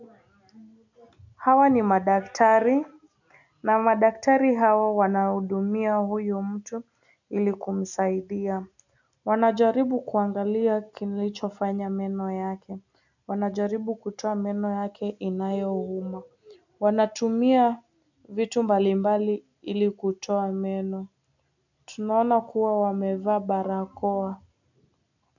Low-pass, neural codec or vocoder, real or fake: 7.2 kHz; none; real